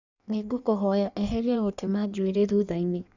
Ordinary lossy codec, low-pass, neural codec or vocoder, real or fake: none; 7.2 kHz; codec, 16 kHz in and 24 kHz out, 1.1 kbps, FireRedTTS-2 codec; fake